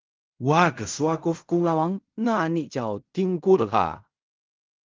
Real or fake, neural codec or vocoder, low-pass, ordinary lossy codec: fake; codec, 16 kHz in and 24 kHz out, 0.4 kbps, LongCat-Audio-Codec, fine tuned four codebook decoder; 7.2 kHz; Opus, 32 kbps